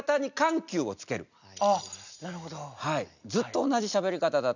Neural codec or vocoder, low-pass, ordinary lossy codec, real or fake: none; 7.2 kHz; none; real